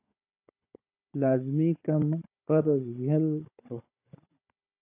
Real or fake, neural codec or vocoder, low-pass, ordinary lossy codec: fake; codec, 16 kHz, 16 kbps, FunCodec, trained on Chinese and English, 50 frames a second; 3.6 kHz; AAC, 24 kbps